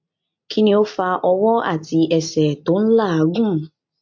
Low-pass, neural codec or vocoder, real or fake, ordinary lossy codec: 7.2 kHz; none; real; MP3, 48 kbps